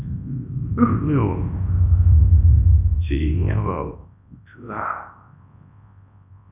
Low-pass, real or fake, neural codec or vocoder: 3.6 kHz; fake; codec, 24 kHz, 0.9 kbps, WavTokenizer, large speech release